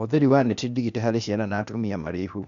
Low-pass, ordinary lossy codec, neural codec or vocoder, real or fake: 7.2 kHz; none; codec, 16 kHz, 0.8 kbps, ZipCodec; fake